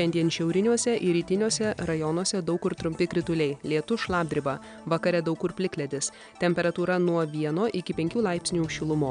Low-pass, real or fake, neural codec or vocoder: 9.9 kHz; real; none